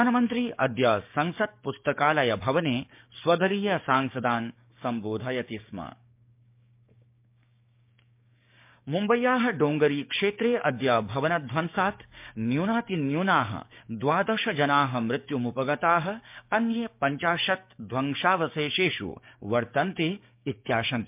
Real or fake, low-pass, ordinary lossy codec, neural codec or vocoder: fake; 3.6 kHz; MP3, 32 kbps; codec, 16 kHz, 6 kbps, DAC